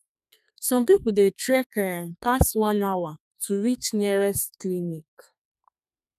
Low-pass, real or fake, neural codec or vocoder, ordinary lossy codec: 14.4 kHz; fake; codec, 32 kHz, 1.9 kbps, SNAC; none